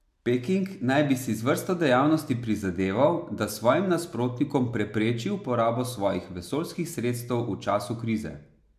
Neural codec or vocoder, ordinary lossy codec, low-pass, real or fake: none; MP3, 96 kbps; 14.4 kHz; real